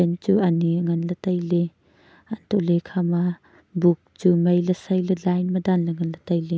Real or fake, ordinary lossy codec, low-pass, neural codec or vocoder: real; none; none; none